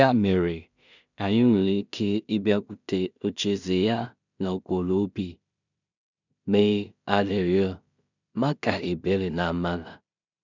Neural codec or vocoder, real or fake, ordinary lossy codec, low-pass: codec, 16 kHz in and 24 kHz out, 0.4 kbps, LongCat-Audio-Codec, two codebook decoder; fake; none; 7.2 kHz